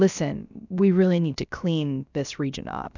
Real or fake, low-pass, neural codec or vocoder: fake; 7.2 kHz; codec, 16 kHz, about 1 kbps, DyCAST, with the encoder's durations